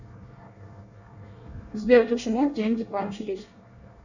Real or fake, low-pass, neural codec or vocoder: fake; 7.2 kHz; codec, 24 kHz, 1 kbps, SNAC